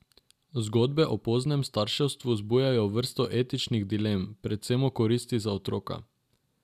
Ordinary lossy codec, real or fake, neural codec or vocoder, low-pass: none; real; none; 14.4 kHz